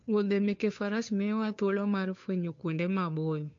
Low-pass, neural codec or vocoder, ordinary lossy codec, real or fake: 7.2 kHz; codec, 16 kHz, 2 kbps, FunCodec, trained on Chinese and English, 25 frames a second; MP3, 48 kbps; fake